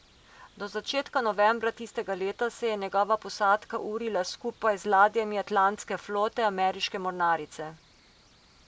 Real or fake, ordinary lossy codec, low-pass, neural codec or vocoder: real; none; none; none